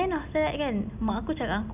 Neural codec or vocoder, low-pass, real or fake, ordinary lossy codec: none; 3.6 kHz; real; none